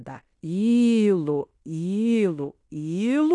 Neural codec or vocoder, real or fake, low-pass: codec, 16 kHz in and 24 kHz out, 0.9 kbps, LongCat-Audio-Codec, fine tuned four codebook decoder; fake; 10.8 kHz